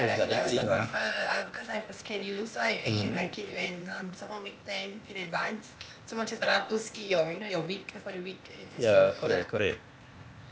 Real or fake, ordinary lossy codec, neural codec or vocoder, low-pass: fake; none; codec, 16 kHz, 0.8 kbps, ZipCodec; none